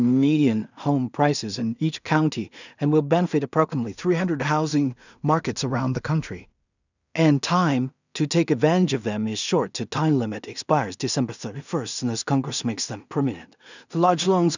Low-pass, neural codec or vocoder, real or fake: 7.2 kHz; codec, 16 kHz in and 24 kHz out, 0.4 kbps, LongCat-Audio-Codec, two codebook decoder; fake